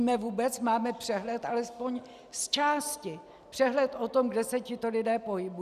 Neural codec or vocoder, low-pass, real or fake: none; 14.4 kHz; real